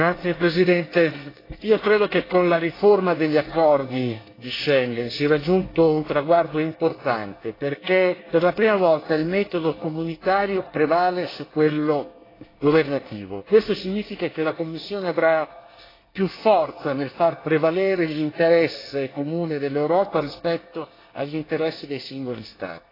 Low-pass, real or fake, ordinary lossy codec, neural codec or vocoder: 5.4 kHz; fake; AAC, 24 kbps; codec, 24 kHz, 1 kbps, SNAC